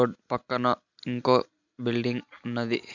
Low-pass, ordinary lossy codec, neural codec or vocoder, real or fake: 7.2 kHz; none; none; real